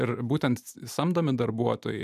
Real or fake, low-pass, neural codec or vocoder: real; 14.4 kHz; none